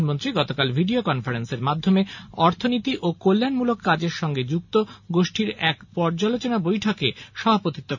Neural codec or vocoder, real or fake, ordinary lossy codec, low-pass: none; real; none; 7.2 kHz